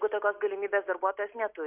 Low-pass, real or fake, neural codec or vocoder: 3.6 kHz; real; none